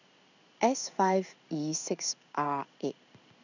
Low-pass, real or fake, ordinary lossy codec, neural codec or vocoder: 7.2 kHz; fake; none; codec, 16 kHz in and 24 kHz out, 1 kbps, XY-Tokenizer